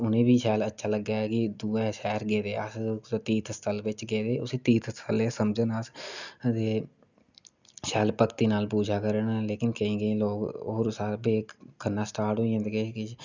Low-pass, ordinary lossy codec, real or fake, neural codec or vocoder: 7.2 kHz; none; real; none